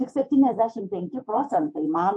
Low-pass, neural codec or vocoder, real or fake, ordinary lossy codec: 10.8 kHz; codec, 24 kHz, 3.1 kbps, DualCodec; fake; MP3, 48 kbps